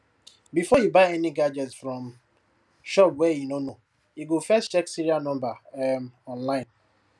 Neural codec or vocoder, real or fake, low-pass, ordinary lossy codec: none; real; none; none